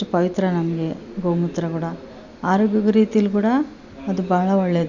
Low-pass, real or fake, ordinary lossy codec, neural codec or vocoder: 7.2 kHz; real; none; none